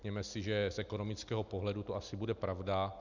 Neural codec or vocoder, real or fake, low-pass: none; real; 7.2 kHz